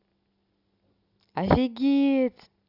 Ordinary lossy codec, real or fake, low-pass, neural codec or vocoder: Opus, 64 kbps; real; 5.4 kHz; none